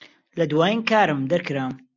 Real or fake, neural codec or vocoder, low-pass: real; none; 7.2 kHz